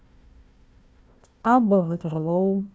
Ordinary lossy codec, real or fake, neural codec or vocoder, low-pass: none; fake; codec, 16 kHz, 1 kbps, FunCodec, trained on Chinese and English, 50 frames a second; none